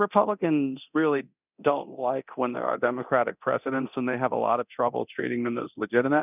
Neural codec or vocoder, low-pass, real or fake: codec, 24 kHz, 0.9 kbps, DualCodec; 3.6 kHz; fake